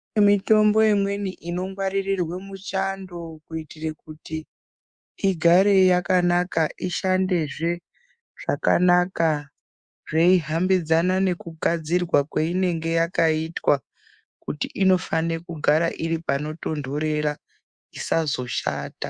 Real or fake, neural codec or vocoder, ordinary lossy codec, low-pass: fake; codec, 24 kHz, 3.1 kbps, DualCodec; Opus, 64 kbps; 9.9 kHz